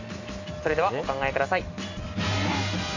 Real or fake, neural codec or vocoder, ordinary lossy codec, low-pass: real; none; AAC, 48 kbps; 7.2 kHz